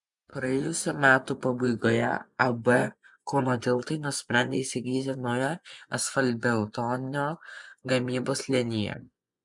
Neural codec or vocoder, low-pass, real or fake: vocoder, 24 kHz, 100 mel bands, Vocos; 10.8 kHz; fake